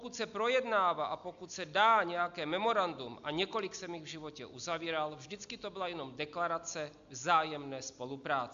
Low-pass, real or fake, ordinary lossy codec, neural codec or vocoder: 7.2 kHz; real; AAC, 64 kbps; none